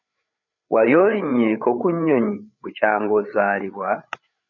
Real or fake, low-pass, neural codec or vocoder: fake; 7.2 kHz; codec, 16 kHz, 8 kbps, FreqCodec, larger model